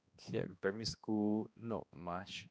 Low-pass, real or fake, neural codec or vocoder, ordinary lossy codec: none; fake; codec, 16 kHz, 1 kbps, X-Codec, HuBERT features, trained on balanced general audio; none